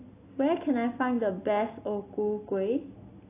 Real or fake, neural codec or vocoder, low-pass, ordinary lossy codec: real; none; 3.6 kHz; AAC, 24 kbps